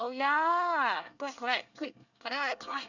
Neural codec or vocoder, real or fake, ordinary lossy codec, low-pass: codec, 24 kHz, 1 kbps, SNAC; fake; none; 7.2 kHz